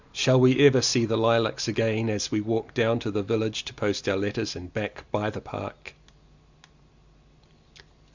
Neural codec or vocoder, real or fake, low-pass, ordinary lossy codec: none; real; 7.2 kHz; Opus, 64 kbps